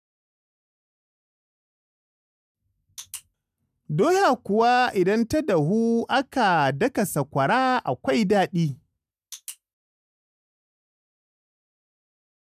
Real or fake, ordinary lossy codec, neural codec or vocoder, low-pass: real; none; none; 14.4 kHz